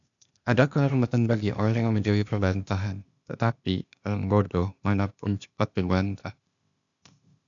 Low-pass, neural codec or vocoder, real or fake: 7.2 kHz; codec, 16 kHz, 0.8 kbps, ZipCodec; fake